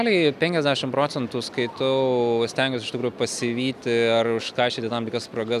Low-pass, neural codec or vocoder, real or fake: 14.4 kHz; none; real